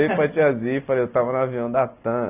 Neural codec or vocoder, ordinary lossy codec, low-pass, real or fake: none; none; 3.6 kHz; real